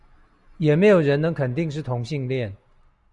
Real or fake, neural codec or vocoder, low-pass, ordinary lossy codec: real; none; 10.8 kHz; Opus, 64 kbps